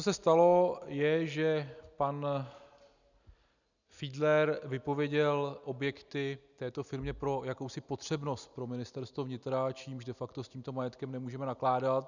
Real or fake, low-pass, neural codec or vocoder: real; 7.2 kHz; none